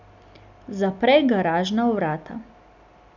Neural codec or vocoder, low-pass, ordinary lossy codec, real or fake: none; 7.2 kHz; none; real